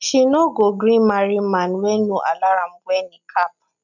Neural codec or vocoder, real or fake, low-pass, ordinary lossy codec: none; real; 7.2 kHz; none